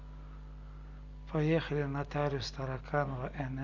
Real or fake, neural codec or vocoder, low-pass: real; none; 7.2 kHz